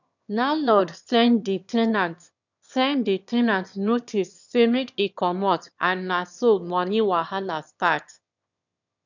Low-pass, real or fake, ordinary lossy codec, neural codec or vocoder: 7.2 kHz; fake; none; autoencoder, 22.05 kHz, a latent of 192 numbers a frame, VITS, trained on one speaker